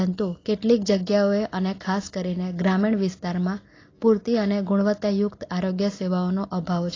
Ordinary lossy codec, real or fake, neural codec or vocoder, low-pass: AAC, 32 kbps; real; none; 7.2 kHz